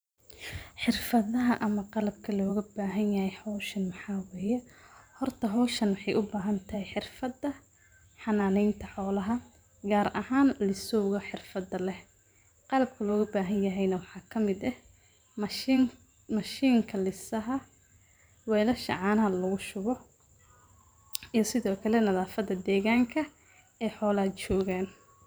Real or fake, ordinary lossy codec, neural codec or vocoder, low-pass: fake; none; vocoder, 44.1 kHz, 128 mel bands every 512 samples, BigVGAN v2; none